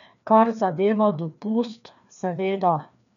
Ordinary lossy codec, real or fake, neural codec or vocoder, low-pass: none; fake; codec, 16 kHz, 2 kbps, FreqCodec, larger model; 7.2 kHz